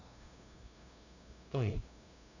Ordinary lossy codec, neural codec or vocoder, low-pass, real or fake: none; codec, 16 kHz, 0.5 kbps, FunCodec, trained on LibriTTS, 25 frames a second; 7.2 kHz; fake